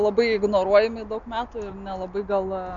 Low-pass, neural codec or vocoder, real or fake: 7.2 kHz; none; real